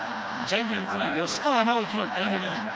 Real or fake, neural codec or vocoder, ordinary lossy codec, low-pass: fake; codec, 16 kHz, 1 kbps, FreqCodec, smaller model; none; none